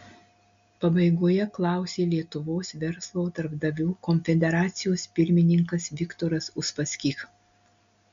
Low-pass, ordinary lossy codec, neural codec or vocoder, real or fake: 7.2 kHz; MP3, 64 kbps; none; real